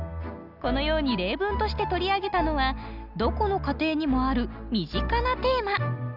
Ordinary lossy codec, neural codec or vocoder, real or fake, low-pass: none; none; real; 5.4 kHz